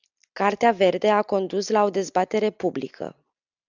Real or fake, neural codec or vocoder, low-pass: real; none; 7.2 kHz